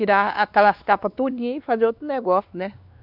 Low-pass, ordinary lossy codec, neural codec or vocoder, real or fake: 5.4 kHz; none; codec, 16 kHz, 2 kbps, X-Codec, WavLM features, trained on Multilingual LibriSpeech; fake